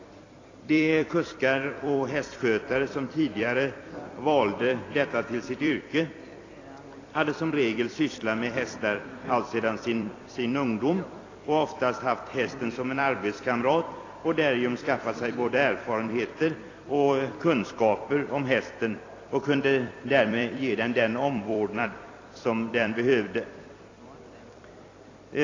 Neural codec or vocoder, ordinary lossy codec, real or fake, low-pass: vocoder, 44.1 kHz, 128 mel bands every 256 samples, BigVGAN v2; AAC, 32 kbps; fake; 7.2 kHz